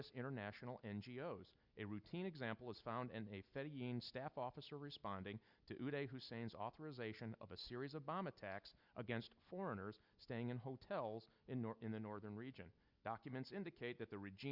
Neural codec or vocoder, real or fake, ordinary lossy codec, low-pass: none; real; Opus, 64 kbps; 5.4 kHz